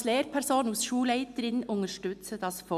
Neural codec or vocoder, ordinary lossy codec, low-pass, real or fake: none; none; 14.4 kHz; real